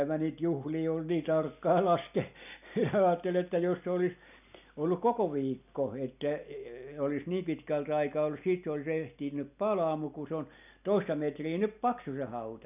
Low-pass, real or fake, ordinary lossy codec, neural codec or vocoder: 3.6 kHz; real; none; none